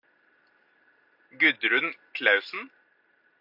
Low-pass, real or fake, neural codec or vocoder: 5.4 kHz; real; none